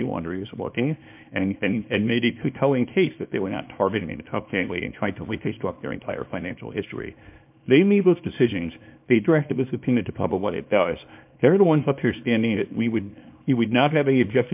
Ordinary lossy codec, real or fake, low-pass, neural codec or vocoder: MP3, 32 kbps; fake; 3.6 kHz; codec, 24 kHz, 0.9 kbps, WavTokenizer, small release